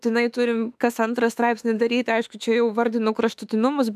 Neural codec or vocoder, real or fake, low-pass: autoencoder, 48 kHz, 32 numbers a frame, DAC-VAE, trained on Japanese speech; fake; 14.4 kHz